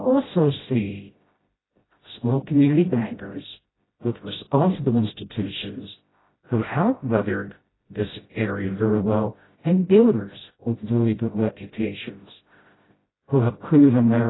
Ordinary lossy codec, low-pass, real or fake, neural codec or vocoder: AAC, 16 kbps; 7.2 kHz; fake; codec, 16 kHz, 0.5 kbps, FreqCodec, smaller model